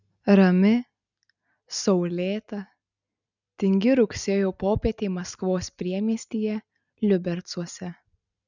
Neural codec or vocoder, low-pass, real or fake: none; 7.2 kHz; real